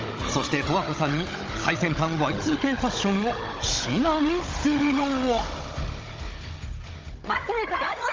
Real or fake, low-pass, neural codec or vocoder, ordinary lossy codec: fake; 7.2 kHz; codec, 16 kHz, 16 kbps, FunCodec, trained on Chinese and English, 50 frames a second; Opus, 24 kbps